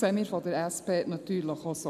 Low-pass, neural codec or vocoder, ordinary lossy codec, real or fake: 14.4 kHz; none; none; real